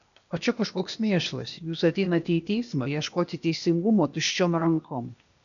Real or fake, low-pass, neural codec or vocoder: fake; 7.2 kHz; codec, 16 kHz, 0.8 kbps, ZipCodec